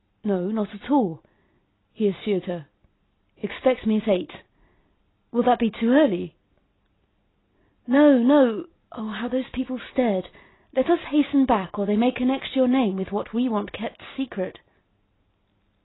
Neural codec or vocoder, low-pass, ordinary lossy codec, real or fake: none; 7.2 kHz; AAC, 16 kbps; real